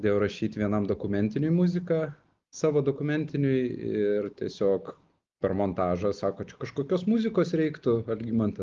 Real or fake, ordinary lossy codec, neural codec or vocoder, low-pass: real; Opus, 32 kbps; none; 7.2 kHz